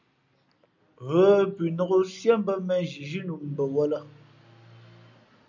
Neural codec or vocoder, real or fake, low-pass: none; real; 7.2 kHz